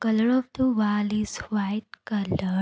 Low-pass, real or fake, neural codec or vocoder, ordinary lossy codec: none; real; none; none